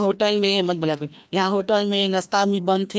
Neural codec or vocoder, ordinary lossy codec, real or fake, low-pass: codec, 16 kHz, 1 kbps, FreqCodec, larger model; none; fake; none